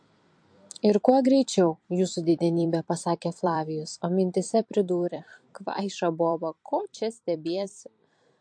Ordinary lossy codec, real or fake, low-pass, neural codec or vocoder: MP3, 48 kbps; fake; 9.9 kHz; vocoder, 44.1 kHz, 128 mel bands every 256 samples, BigVGAN v2